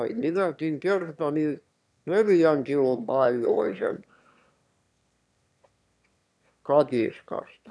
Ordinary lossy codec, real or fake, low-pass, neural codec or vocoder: none; fake; none; autoencoder, 22.05 kHz, a latent of 192 numbers a frame, VITS, trained on one speaker